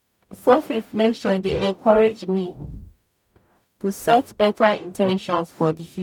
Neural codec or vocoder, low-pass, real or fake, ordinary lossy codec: codec, 44.1 kHz, 0.9 kbps, DAC; 19.8 kHz; fake; none